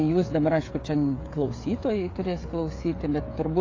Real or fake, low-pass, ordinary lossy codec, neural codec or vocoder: fake; 7.2 kHz; AAC, 32 kbps; codec, 16 kHz, 16 kbps, FreqCodec, smaller model